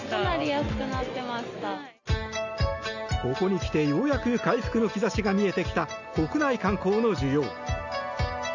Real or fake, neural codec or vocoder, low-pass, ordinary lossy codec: real; none; 7.2 kHz; none